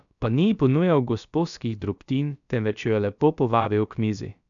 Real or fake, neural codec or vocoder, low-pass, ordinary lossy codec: fake; codec, 16 kHz, about 1 kbps, DyCAST, with the encoder's durations; 7.2 kHz; none